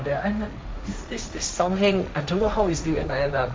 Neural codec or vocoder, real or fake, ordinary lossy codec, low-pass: codec, 16 kHz, 1.1 kbps, Voila-Tokenizer; fake; none; none